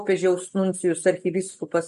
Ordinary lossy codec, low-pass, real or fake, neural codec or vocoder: MP3, 48 kbps; 14.4 kHz; real; none